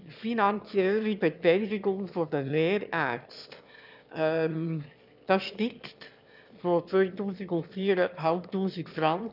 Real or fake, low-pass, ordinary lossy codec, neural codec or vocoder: fake; 5.4 kHz; none; autoencoder, 22.05 kHz, a latent of 192 numbers a frame, VITS, trained on one speaker